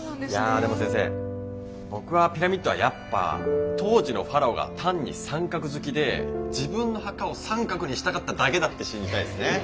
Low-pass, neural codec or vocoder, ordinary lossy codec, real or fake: none; none; none; real